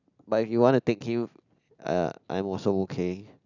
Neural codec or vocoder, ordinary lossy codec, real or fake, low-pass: none; none; real; 7.2 kHz